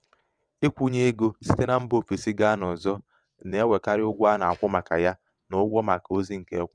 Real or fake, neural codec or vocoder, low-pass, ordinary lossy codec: fake; vocoder, 22.05 kHz, 80 mel bands, WaveNeXt; 9.9 kHz; none